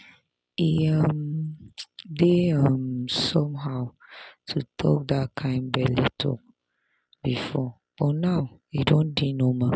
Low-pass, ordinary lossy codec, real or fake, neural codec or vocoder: none; none; real; none